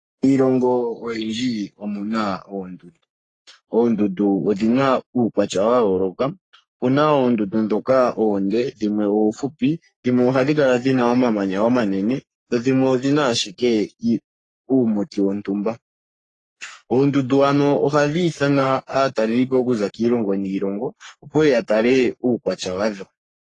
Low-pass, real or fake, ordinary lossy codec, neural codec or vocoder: 10.8 kHz; fake; AAC, 32 kbps; codec, 44.1 kHz, 3.4 kbps, Pupu-Codec